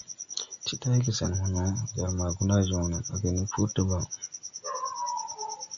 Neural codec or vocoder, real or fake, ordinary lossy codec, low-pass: none; real; MP3, 64 kbps; 7.2 kHz